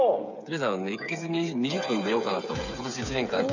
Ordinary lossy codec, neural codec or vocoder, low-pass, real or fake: none; codec, 16 kHz, 8 kbps, FreqCodec, smaller model; 7.2 kHz; fake